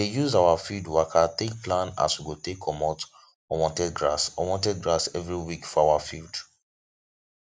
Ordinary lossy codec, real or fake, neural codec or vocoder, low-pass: none; real; none; none